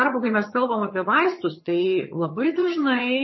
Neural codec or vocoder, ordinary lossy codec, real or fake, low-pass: vocoder, 22.05 kHz, 80 mel bands, HiFi-GAN; MP3, 24 kbps; fake; 7.2 kHz